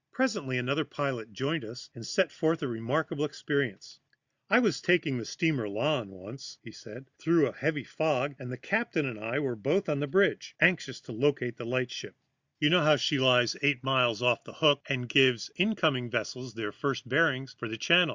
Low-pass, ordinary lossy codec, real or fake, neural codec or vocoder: 7.2 kHz; Opus, 64 kbps; real; none